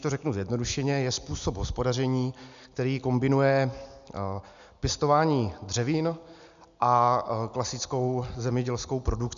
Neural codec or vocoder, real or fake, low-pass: none; real; 7.2 kHz